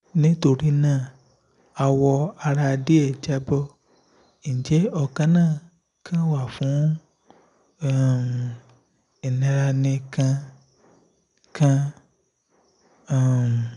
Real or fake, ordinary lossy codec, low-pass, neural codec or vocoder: real; none; 10.8 kHz; none